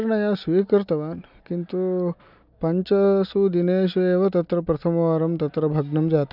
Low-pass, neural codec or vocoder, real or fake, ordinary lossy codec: 5.4 kHz; none; real; none